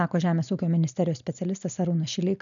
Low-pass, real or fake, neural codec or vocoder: 7.2 kHz; real; none